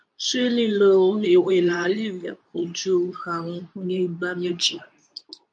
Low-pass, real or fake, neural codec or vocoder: 9.9 kHz; fake; codec, 24 kHz, 0.9 kbps, WavTokenizer, medium speech release version 2